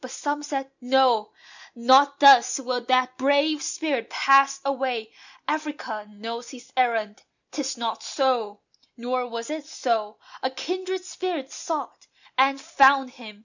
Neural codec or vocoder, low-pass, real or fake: none; 7.2 kHz; real